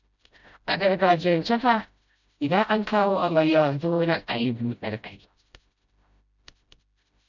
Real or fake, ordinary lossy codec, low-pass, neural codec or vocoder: fake; none; 7.2 kHz; codec, 16 kHz, 0.5 kbps, FreqCodec, smaller model